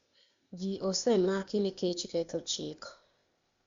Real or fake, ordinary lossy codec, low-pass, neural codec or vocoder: fake; Opus, 64 kbps; 7.2 kHz; codec, 16 kHz, 0.8 kbps, ZipCodec